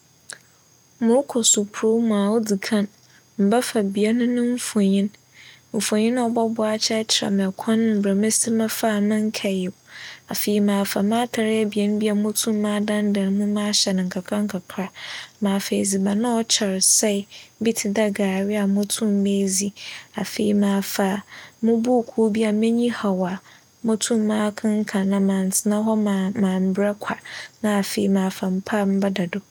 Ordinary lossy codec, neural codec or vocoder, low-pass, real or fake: none; none; 19.8 kHz; real